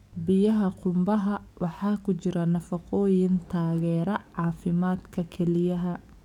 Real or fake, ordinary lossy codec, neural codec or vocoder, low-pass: fake; none; codec, 44.1 kHz, 7.8 kbps, DAC; 19.8 kHz